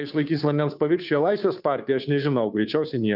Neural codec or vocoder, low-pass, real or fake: codec, 16 kHz, 2 kbps, FunCodec, trained on Chinese and English, 25 frames a second; 5.4 kHz; fake